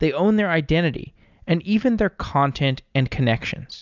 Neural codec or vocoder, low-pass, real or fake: none; 7.2 kHz; real